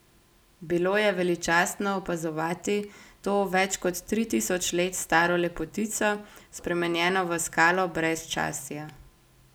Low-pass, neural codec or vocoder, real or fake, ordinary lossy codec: none; none; real; none